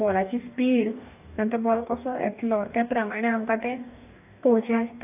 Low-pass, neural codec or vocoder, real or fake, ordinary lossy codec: 3.6 kHz; codec, 44.1 kHz, 2.6 kbps, DAC; fake; AAC, 32 kbps